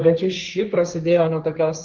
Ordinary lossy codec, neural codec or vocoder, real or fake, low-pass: Opus, 32 kbps; codec, 16 kHz, 1.1 kbps, Voila-Tokenizer; fake; 7.2 kHz